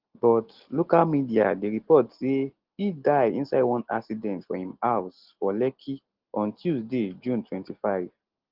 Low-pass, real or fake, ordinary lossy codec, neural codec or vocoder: 5.4 kHz; real; Opus, 16 kbps; none